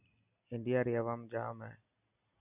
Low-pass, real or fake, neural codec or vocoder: 3.6 kHz; real; none